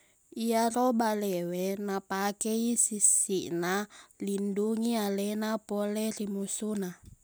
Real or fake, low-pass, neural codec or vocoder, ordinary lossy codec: real; none; none; none